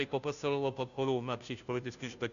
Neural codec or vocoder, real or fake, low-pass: codec, 16 kHz, 0.5 kbps, FunCodec, trained on Chinese and English, 25 frames a second; fake; 7.2 kHz